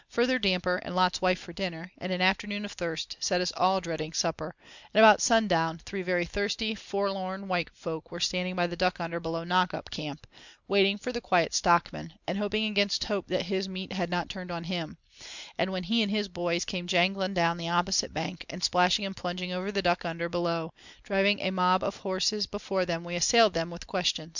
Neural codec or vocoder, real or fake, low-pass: none; real; 7.2 kHz